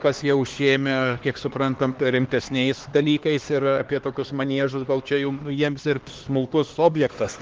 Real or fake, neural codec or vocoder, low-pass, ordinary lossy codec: fake; codec, 16 kHz, 2 kbps, X-Codec, HuBERT features, trained on LibriSpeech; 7.2 kHz; Opus, 16 kbps